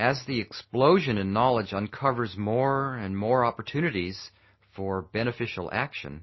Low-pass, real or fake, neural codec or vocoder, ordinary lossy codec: 7.2 kHz; real; none; MP3, 24 kbps